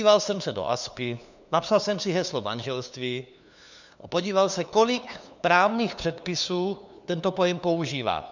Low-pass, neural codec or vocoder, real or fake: 7.2 kHz; codec, 16 kHz, 2 kbps, FunCodec, trained on LibriTTS, 25 frames a second; fake